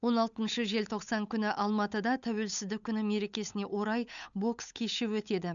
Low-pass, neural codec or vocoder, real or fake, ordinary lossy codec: 7.2 kHz; codec, 16 kHz, 8 kbps, FunCodec, trained on Chinese and English, 25 frames a second; fake; none